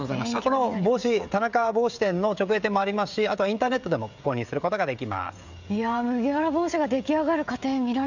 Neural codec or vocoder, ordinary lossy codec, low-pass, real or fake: codec, 16 kHz, 16 kbps, FreqCodec, smaller model; none; 7.2 kHz; fake